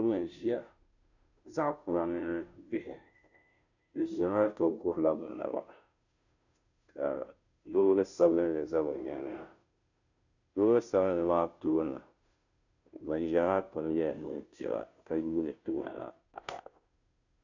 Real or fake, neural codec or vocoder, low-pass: fake; codec, 16 kHz, 0.5 kbps, FunCodec, trained on Chinese and English, 25 frames a second; 7.2 kHz